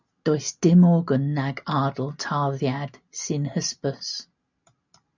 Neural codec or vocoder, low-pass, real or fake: none; 7.2 kHz; real